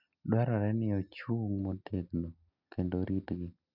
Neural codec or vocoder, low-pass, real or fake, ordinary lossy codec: none; 5.4 kHz; real; none